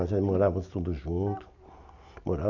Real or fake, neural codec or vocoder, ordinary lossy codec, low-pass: real; none; none; 7.2 kHz